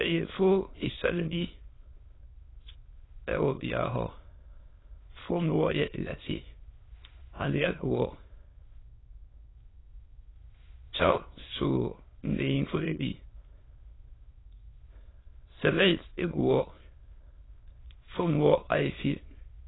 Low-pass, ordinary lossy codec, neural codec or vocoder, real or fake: 7.2 kHz; AAC, 16 kbps; autoencoder, 22.05 kHz, a latent of 192 numbers a frame, VITS, trained on many speakers; fake